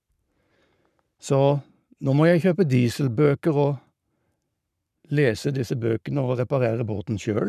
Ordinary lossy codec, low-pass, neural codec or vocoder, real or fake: none; 14.4 kHz; codec, 44.1 kHz, 7.8 kbps, Pupu-Codec; fake